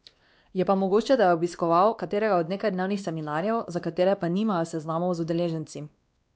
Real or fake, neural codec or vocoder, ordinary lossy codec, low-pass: fake; codec, 16 kHz, 2 kbps, X-Codec, WavLM features, trained on Multilingual LibriSpeech; none; none